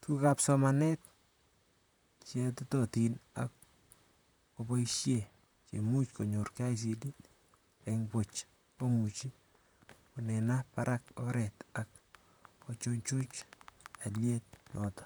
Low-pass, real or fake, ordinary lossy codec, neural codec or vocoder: none; fake; none; vocoder, 44.1 kHz, 128 mel bands, Pupu-Vocoder